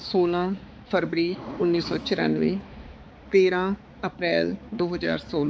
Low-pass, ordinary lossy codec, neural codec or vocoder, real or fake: none; none; codec, 16 kHz, 4 kbps, X-Codec, HuBERT features, trained on balanced general audio; fake